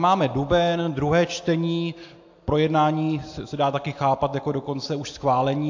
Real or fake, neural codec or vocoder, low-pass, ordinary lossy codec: real; none; 7.2 kHz; AAC, 48 kbps